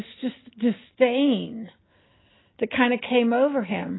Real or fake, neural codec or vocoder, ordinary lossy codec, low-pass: real; none; AAC, 16 kbps; 7.2 kHz